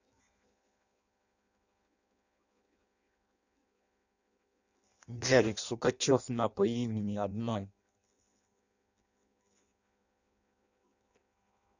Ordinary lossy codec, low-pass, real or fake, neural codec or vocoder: none; 7.2 kHz; fake; codec, 16 kHz in and 24 kHz out, 0.6 kbps, FireRedTTS-2 codec